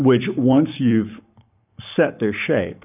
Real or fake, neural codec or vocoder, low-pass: fake; codec, 44.1 kHz, 7.8 kbps, Pupu-Codec; 3.6 kHz